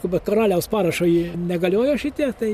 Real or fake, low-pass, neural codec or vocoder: real; 14.4 kHz; none